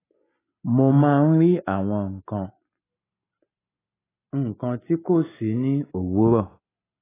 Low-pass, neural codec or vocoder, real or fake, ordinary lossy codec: 3.6 kHz; none; real; AAC, 16 kbps